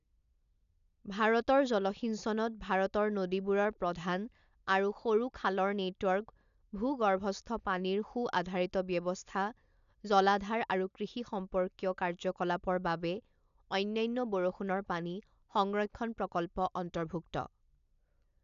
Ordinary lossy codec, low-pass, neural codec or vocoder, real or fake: none; 7.2 kHz; none; real